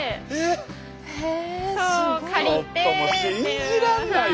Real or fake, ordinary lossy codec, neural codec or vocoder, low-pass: real; none; none; none